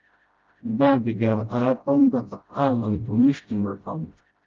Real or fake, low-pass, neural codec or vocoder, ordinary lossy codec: fake; 7.2 kHz; codec, 16 kHz, 0.5 kbps, FreqCodec, smaller model; Opus, 32 kbps